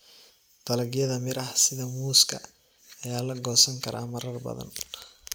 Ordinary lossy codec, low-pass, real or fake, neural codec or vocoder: none; none; real; none